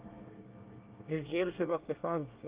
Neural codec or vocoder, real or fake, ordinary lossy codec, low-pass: codec, 24 kHz, 1 kbps, SNAC; fake; Opus, 24 kbps; 3.6 kHz